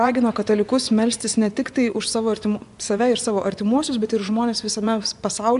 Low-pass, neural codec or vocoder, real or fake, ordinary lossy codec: 10.8 kHz; vocoder, 24 kHz, 100 mel bands, Vocos; fake; AAC, 96 kbps